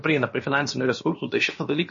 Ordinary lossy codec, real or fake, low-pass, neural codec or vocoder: MP3, 32 kbps; fake; 7.2 kHz; codec, 16 kHz, about 1 kbps, DyCAST, with the encoder's durations